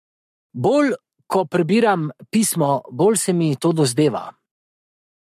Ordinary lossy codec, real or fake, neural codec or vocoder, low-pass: MP3, 64 kbps; fake; codec, 44.1 kHz, 7.8 kbps, Pupu-Codec; 14.4 kHz